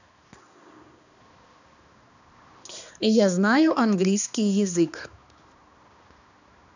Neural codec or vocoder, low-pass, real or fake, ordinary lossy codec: codec, 16 kHz, 2 kbps, X-Codec, HuBERT features, trained on balanced general audio; 7.2 kHz; fake; none